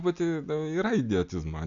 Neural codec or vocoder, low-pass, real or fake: none; 7.2 kHz; real